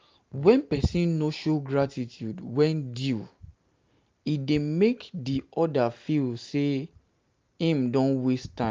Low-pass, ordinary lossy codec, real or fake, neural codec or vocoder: 7.2 kHz; Opus, 32 kbps; real; none